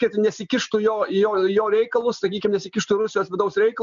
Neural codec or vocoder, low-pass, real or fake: none; 7.2 kHz; real